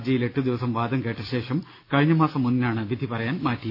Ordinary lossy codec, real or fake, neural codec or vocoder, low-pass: none; real; none; 5.4 kHz